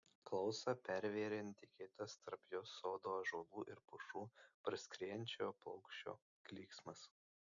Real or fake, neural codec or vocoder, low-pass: real; none; 7.2 kHz